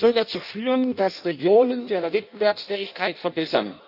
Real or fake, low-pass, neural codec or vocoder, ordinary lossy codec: fake; 5.4 kHz; codec, 16 kHz in and 24 kHz out, 0.6 kbps, FireRedTTS-2 codec; none